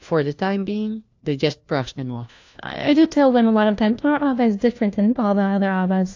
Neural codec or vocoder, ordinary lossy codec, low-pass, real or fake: codec, 16 kHz, 1 kbps, FunCodec, trained on LibriTTS, 50 frames a second; AAC, 48 kbps; 7.2 kHz; fake